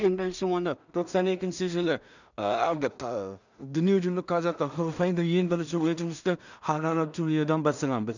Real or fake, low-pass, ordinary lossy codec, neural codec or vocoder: fake; 7.2 kHz; none; codec, 16 kHz in and 24 kHz out, 0.4 kbps, LongCat-Audio-Codec, two codebook decoder